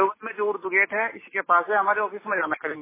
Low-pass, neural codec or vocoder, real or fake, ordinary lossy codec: 3.6 kHz; none; real; MP3, 16 kbps